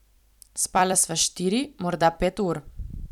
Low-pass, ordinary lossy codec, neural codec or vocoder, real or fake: 19.8 kHz; none; vocoder, 44.1 kHz, 128 mel bands every 256 samples, BigVGAN v2; fake